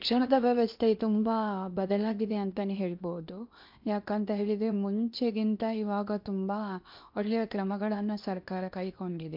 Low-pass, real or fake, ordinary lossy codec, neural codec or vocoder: 5.4 kHz; fake; MP3, 48 kbps; codec, 16 kHz in and 24 kHz out, 0.8 kbps, FocalCodec, streaming, 65536 codes